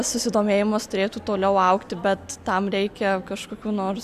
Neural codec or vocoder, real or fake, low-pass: none; real; 14.4 kHz